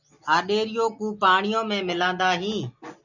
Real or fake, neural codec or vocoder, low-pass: real; none; 7.2 kHz